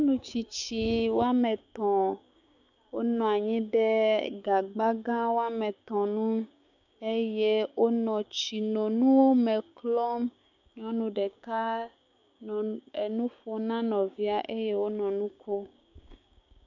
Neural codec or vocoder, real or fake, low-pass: codec, 16 kHz, 6 kbps, DAC; fake; 7.2 kHz